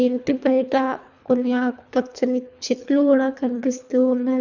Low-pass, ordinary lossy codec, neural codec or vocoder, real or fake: 7.2 kHz; none; codec, 24 kHz, 3 kbps, HILCodec; fake